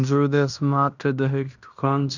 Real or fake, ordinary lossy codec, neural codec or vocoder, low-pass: fake; none; codec, 16 kHz in and 24 kHz out, 0.9 kbps, LongCat-Audio-Codec, fine tuned four codebook decoder; 7.2 kHz